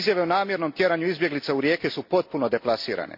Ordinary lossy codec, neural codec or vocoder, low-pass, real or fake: MP3, 32 kbps; none; 5.4 kHz; real